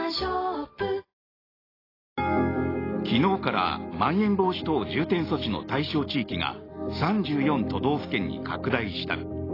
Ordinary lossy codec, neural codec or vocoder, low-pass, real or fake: AAC, 24 kbps; none; 5.4 kHz; real